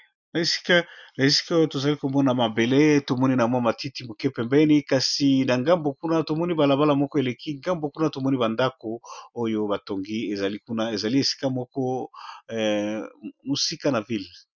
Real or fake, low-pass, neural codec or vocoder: real; 7.2 kHz; none